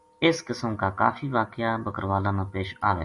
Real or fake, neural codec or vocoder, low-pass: real; none; 10.8 kHz